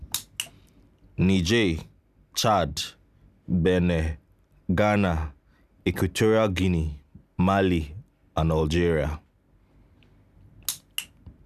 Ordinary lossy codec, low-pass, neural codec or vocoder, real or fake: none; 14.4 kHz; none; real